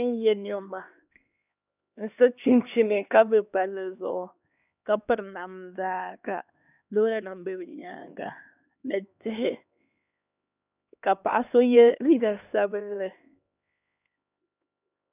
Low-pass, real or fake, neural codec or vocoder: 3.6 kHz; fake; codec, 16 kHz, 2 kbps, X-Codec, HuBERT features, trained on LibriSpeech